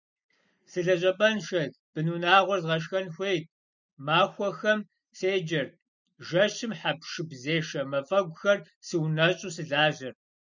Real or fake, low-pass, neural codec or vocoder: real; 7.2 kHz; none